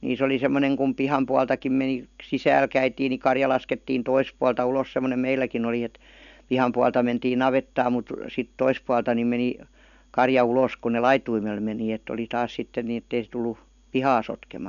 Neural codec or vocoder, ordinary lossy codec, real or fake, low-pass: none; none; real; 7.2 kHz